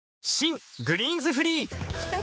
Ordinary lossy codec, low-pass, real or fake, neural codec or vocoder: none; none; fake; codec, 16 kHz, 4 kbps, X-Codec, HuBERT features, trained on general audio